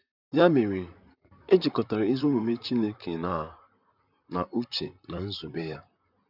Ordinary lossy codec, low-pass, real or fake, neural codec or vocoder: none; 5.4 kHz; fake; vocoder, 44.1 kHz, 128 mel bands, Pupu-Vocoder